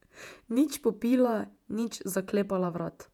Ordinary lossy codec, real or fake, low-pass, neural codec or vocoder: none; fake; 19.8 kHz; vocoder, 48 kHz, 128 mel bands, Vocos